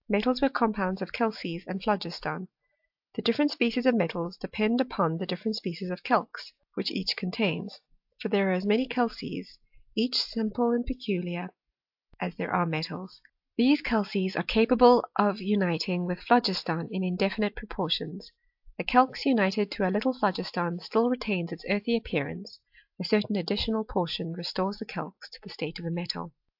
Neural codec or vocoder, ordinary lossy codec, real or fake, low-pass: none; AAC, 48 kbps; real; 5.4 kHz